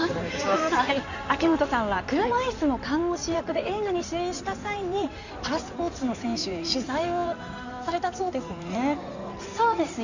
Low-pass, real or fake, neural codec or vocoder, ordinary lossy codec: 7.2 kHz; fake; codec, 16 kHz in and 24 kHz out, 2.2 kbps, FireRedTTS-2 codec; none